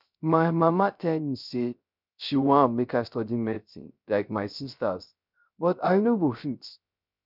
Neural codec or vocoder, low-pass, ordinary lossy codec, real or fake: codec, 16 kHz, 0.3 kbps, FocalCodec; 5.4 kHz; none; fake